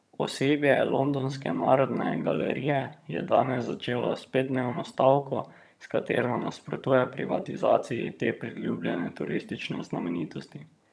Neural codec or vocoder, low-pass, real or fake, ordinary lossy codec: vocoder, 22.05 kHz, 80 mel bands, HiFi-GAN; none; fake; none